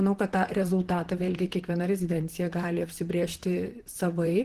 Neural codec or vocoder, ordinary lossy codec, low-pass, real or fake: vocoder, 44.1 kHz, 128 mel bands, Pupu-Vocoder; Opus, 16 kbps; 14.4 kHz; fake